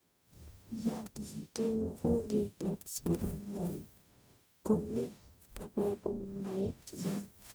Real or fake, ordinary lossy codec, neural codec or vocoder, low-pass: fake; none; codec, 44.1 kHz, 0.9 kbps, DAC; none